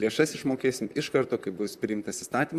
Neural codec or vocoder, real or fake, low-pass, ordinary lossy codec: vocoder, 44.1 kHz, 128 mel bands, Pupu-Vocoder; fake; 14.4 kHz; Opus, 64 kbps